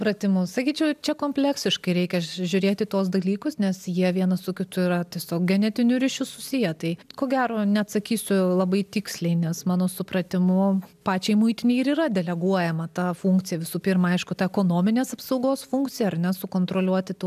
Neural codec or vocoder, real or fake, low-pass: none; real; 14.4 kHz